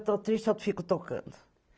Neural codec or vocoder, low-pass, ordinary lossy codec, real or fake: none; none; none; real